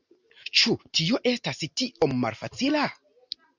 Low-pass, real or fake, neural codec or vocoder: 7.2 kHz; real; none